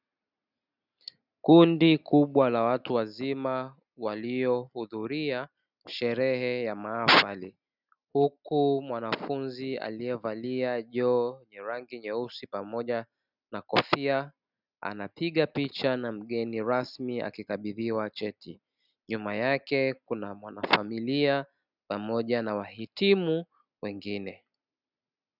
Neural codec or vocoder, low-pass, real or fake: none; 5.4 kHz; real